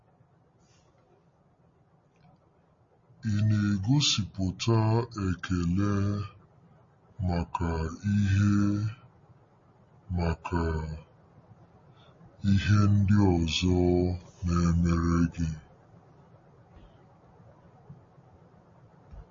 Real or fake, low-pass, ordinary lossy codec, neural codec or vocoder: real; 7.2 kHz; MP3, 32 kbps; none